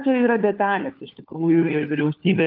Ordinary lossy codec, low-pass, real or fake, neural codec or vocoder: Opus, 24 kbps; 5.4 kHz; fake; codec, 16 kHz, 4 kbps, FunCodec, trained on LibriTTS, 50 frames a second